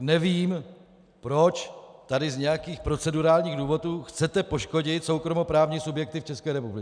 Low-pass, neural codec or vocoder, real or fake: 9.9 kHz; none; real